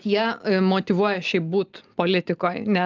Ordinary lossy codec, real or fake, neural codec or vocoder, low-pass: Opus, 24 kbps; real; none; 7.2 kHz